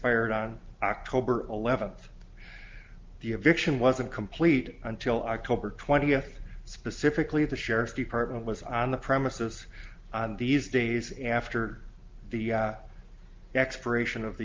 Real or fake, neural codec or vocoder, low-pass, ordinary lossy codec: real; none; 7.2 kHz; Opus, 32 kbps